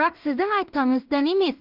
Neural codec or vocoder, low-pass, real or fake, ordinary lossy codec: codec, 16 kHz in and 24 kHz out, 0.4 kbps, LongCat-Audio-Codec, two codebook decoder; 5.4 kHz; fake; Opus, 32 kbps